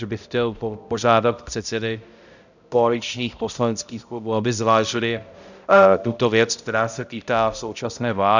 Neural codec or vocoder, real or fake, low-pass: codec, 16 kHz, 0.5 kbps, X-Codec, HuBERT features, trained on balanced general audio; fake; 7.2 kHz